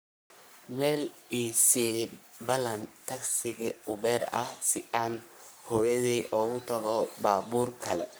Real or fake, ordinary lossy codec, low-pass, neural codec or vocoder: fake; none; none; codec, 44.1 kHz, 3.4 kbps, Pupu-Codec